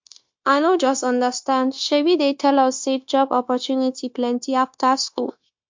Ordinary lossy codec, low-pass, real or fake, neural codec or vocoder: MP3, 48 kbps; 7.2 kHz; fake; codec, 16 kHz, 0.9 kbps, LongCat-Audio-Codec